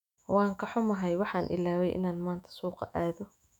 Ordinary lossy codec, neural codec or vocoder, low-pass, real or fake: none; autoencoder, 48 kHz, 128 numbers a frame, DAC-VAE, trained on Japanese speech; 19.8 kHz; fake